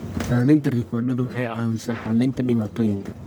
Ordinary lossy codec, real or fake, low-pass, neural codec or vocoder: none; fake; none; codec, 44.1 kHz, 1.7 kbps, Pupu-Codec